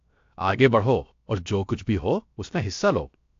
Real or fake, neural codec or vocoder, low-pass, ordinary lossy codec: fake; codec, 16 kHz, 0.7 kbps, FocalCodec; 7.2 kHz; AAC, 48 kbps